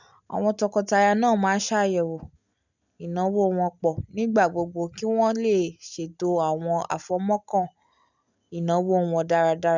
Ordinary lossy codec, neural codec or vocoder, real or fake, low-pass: none; none; real; 7.2 kHz